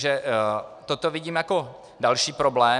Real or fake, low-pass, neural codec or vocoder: real; 10.8 kHz; none